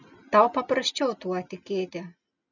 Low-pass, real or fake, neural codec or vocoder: 7.2 kHz; real; none